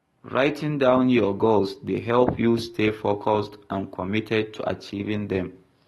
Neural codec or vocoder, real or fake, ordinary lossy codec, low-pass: codec, 44.1 kHz, 7.8 kbps, DAC; fake; AAC, 32 kbps; 19.8 kHz